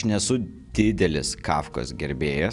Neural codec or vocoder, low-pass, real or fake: none; 10.8 kHz; real